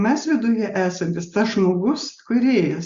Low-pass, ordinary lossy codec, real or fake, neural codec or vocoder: 7.2 kHz; Opus, 64 kbps; real; none